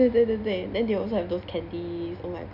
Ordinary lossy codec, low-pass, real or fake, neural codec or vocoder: none; 5.4 kHz; real; none